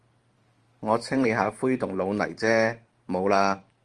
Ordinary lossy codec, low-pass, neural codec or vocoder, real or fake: Opus, 24 kbps; 10.8 kHz; vocoder, 44.1 kHz, 128 mel bands every 512 samples, BigVGAN v2; fake